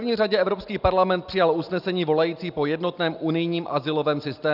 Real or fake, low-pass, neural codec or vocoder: real; 5.4 kHz; none